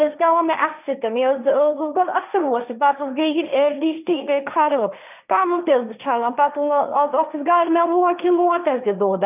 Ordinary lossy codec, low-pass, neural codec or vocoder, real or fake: none; 3.6 kHz; codec, 16 kHz, 1.1 kbps, Voila-Tokenizer; fake